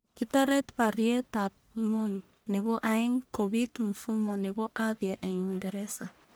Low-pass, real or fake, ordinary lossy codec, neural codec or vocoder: none; fake; none; codec, 44.1 kHz, 1.7 kbps, Pupu-Codec